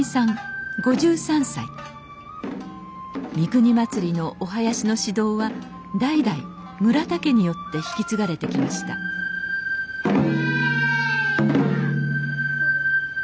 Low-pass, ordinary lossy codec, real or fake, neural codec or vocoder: none; none; real; none